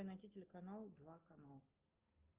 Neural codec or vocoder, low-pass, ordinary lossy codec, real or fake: none; 3.6 kHz; Opus, 32 kbps; real